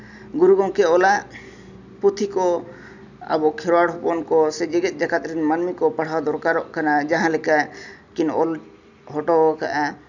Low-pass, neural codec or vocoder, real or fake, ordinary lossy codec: 7.2 kHz; none; real; none